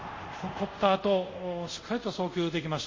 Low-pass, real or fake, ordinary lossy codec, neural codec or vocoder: 7.2 kHz; fake; MP3, 32 kbps; codec, 24 kHz, 0.5 kbps, DualCodec